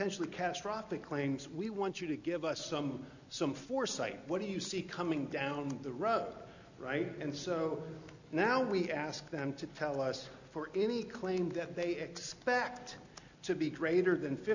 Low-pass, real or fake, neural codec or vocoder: 7.2 kHz; real; none